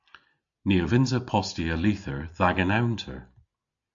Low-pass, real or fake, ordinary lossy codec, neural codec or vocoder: 7.2 kHz; real; AAC, 64 kbps; none